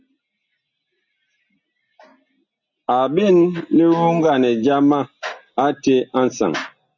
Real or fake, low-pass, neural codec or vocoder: real; 7.2 kHz; none